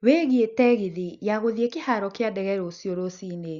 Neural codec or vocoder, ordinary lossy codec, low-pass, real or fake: none; Opus, 64 kbps; 7.2 kHz; real